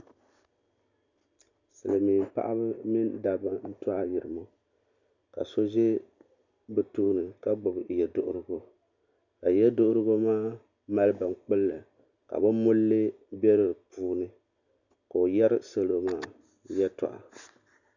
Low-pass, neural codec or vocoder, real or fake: 7.2 kHz; none; real